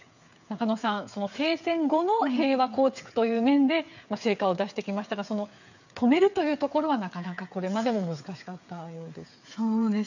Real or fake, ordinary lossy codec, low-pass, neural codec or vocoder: fake; none; 7.2 kHz; codec, 16 kHz, 8 kbps, FreqCodec, smaller model